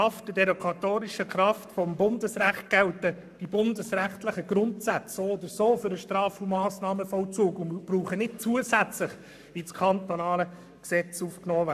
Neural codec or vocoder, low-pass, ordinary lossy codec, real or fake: codec, 44.1 kHz, 7.8 kbps, Pupu-Codec; 14.4 kHz; none; fake